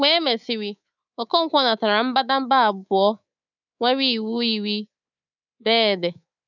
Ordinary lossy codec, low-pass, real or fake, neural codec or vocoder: none; 7.2 kHz; fake; codec, 24 kHz, 3.1 kbps, DualCodec